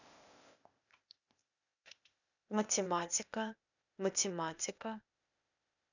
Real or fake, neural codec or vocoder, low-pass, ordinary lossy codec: fake; codec, 16 kHz, 0.8 kbps, ZipCodec; 7.2 kHz; none